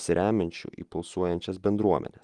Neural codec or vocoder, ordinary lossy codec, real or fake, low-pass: none; Opus, 24 kbps; real; 10.8 kHz